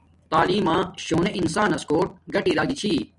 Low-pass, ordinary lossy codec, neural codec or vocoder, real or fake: 10.8 kHz; MP3, 96 kbps; vocoder, 44.1 kHz, 128 mel bands every 256 samples, BigVGAN v2; fake